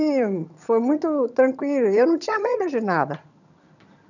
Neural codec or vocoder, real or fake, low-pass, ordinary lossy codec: vocoder, 22.05 kHz, 80 mel bands, HiFi-GAN; fake; 7.2 kHz; none